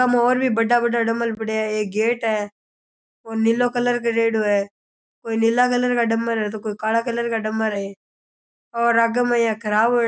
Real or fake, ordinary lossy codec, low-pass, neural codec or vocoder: real; none; none; none